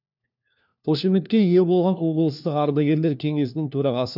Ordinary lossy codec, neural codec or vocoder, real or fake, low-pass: none; codec, 16 kHz, 1 kbps, FunCodec, trained on LibriTTS, 50 frames a second; fake; 5.4 kHz